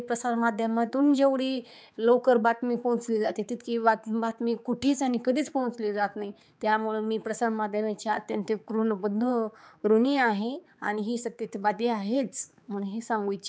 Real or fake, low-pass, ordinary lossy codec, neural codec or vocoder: fake; none; none; codec, 16 kHz, 4 kbps, X-Codec, HuBERT features, trained on balanced general audio